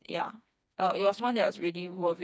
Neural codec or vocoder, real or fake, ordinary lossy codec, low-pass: codec, 16 kHz, 2 kbps, FreqCodec, smaller model; fake; none; none